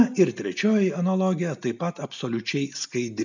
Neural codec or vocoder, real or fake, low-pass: none; real; 7.2 kHz